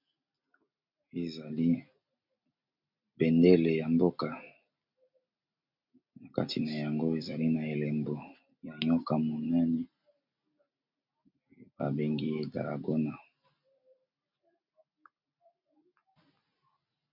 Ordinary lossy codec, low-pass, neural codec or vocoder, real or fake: MP3, 48 kbps; 5.4 kHz; none; real